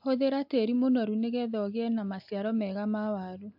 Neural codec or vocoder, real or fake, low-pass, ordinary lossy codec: none; real; 5.4 kHz; AAC, 48 kbps